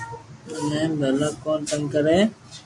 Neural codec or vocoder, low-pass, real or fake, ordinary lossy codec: none; 10.8 kHz; real; MP3, 64 kbps